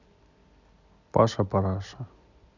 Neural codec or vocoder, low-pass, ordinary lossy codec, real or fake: none; 7.2 kHz; none; real